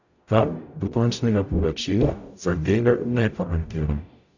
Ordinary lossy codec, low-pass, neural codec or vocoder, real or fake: none; 7.2 kHz; codec, 44.1 kHz, 0.9 kbps, DAC; fake